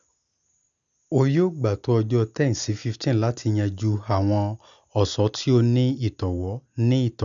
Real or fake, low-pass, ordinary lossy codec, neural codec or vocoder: real; 7.2 kHz; none; none